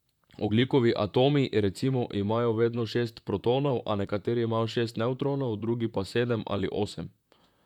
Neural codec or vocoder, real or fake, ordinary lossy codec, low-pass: vocoder, 44.1 kHz, 128 mel bands, Pupu-Vocoder; fake; none; 19.8 kHz